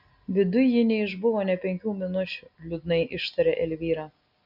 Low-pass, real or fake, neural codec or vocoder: 5.4 kHz; real; none